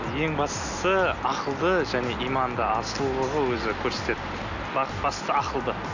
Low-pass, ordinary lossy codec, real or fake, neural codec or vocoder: 7.2 kHz; none; real; none